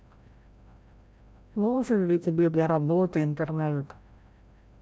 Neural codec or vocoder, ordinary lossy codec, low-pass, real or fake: codec, 16 kHz, 0.5 kbps, FreqCodec, larger model; none; none; fake